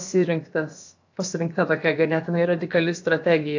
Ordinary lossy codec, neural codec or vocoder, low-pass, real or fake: AAC, 48 kbps; codec, 16 kHz, about 1 kbps, DyCAST, with the encoder's durations; 7.2 kHz; fake